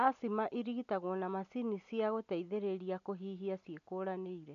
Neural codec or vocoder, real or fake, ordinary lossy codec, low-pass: none; real; none; 7.2 kHz